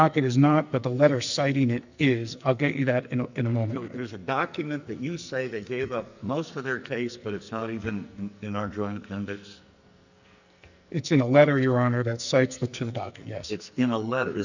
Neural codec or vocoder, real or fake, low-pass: codec, 44.1 kHz, 2.6 kbps, SNAC; fake; 7.2 kHz